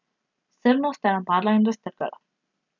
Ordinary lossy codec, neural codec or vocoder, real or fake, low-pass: none; none; real; 7.2 kHz